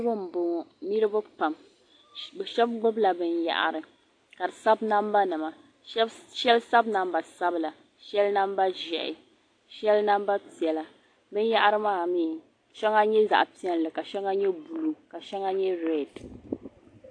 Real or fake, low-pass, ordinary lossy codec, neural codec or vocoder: real; 9.9 kHz; AAC, 48 kbps; none